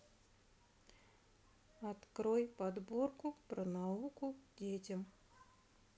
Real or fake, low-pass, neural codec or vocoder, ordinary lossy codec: real; none; none; none